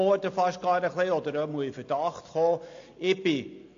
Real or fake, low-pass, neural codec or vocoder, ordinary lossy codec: real; 7.2 kHz; none; AAC, 48 kbps